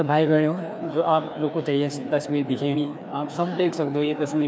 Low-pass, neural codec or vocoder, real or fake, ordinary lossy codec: none; codec, 16 kHz, 2 kbps, FreqCodec, larger model; fake; none